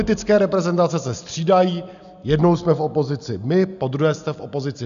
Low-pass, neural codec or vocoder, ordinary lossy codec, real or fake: 7.2 kHz; none; AAC, 96 kbps; real